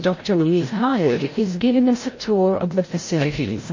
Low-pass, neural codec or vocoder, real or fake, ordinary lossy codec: 7.2 kHz; codec, 16 kHz, 0.5 kbps, FreqCodec, larger model; fake; MP3, 32 kbps